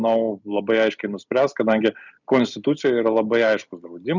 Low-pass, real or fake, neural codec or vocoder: 7.2 kHz; real; none